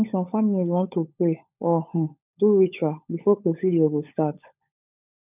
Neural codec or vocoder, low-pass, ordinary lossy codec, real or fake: codec, 16 kHz, 16 kbps, FunCodec, trained on LibriTTS, 50 frames a second; 3.6 kHz; none; fake